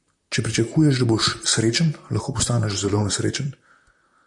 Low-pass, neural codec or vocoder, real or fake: 10.8 kHz; vocoder, 44.1 kHz, 128 mel bands, Pupu-Vocoder; fake